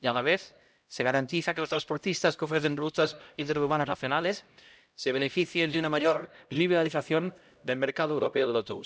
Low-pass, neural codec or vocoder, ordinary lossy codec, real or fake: none; codec, 16 kHz, 0.5 kbps, X-Codec, HuBERT features, trained on LibriSpeech; none; fake